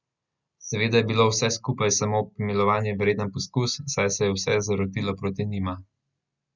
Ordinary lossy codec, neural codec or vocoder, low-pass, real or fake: Opus, 64 kbps; none; 7.2 kHz; real